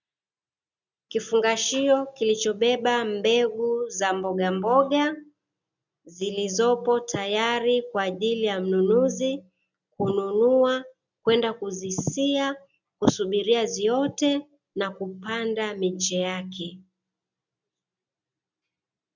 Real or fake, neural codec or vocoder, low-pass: real; none; 7.2 kHz